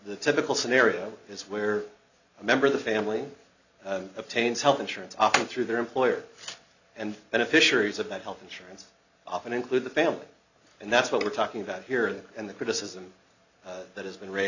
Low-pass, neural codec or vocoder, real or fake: 7.2 kHz; none; real